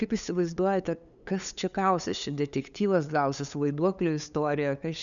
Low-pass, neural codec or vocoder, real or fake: 7.2 kHz; codec, 16 kHz, 8 kbps, FunCodec, trained on LibriTTS, 25 frames a second; fake